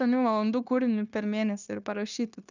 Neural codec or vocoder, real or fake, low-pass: codec, 16 kHz, 0.9 kbps, LongCat-Audio-Codec; fake; 7.2 kHz